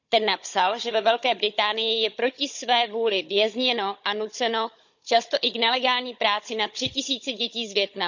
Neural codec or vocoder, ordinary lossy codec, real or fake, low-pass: codec, 16 kHz, 16 kbps, FunCodec, trained on Chinese and English, 50 frames a second; none; fake; 7.2 kHz